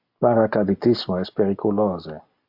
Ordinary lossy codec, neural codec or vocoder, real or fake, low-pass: MP3, 48 kbps; none; real; 5.4 kHz